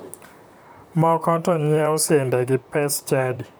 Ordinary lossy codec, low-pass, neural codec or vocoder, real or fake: none; none; vocoder, 44.1 kHz, 128 mel bands, Pupu-Vocoder; fake